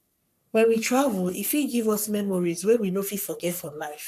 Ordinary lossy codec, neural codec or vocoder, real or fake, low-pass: none; codec, 44.1 kHz, 3.4 kbps, Pupu-Codec; fake; 14.4 kHz